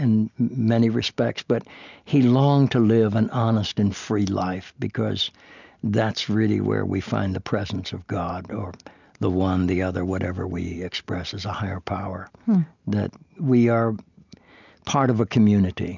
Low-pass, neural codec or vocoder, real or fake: 7.2 kHz; none; real